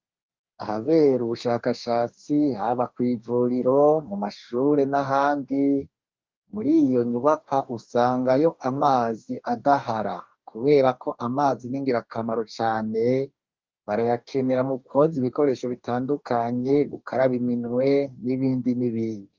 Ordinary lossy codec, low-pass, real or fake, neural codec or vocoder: Opus, 24 kbps; 7.2 kHz; fake; codec, 44.1 kHz, 2.6 kbps, DAC